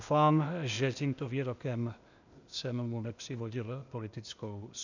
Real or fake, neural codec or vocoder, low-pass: fake; codec, 16 kHz, 0.8 kbps, ZipCodec; 7.2 kHz